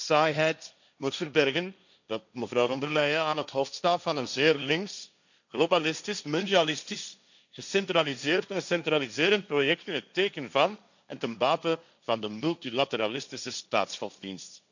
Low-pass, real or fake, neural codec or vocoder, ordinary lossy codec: 7.2 kHz; fake; codec, 16 kHz, 1.1 kbps, Voila-Tokenizer; none